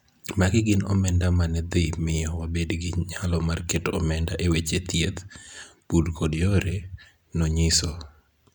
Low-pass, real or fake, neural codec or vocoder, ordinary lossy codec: 19.8 kHz; real; none; none